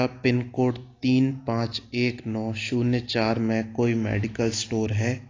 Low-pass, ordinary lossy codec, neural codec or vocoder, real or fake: 7.2 kHz; AAC, 32 kbps; none; real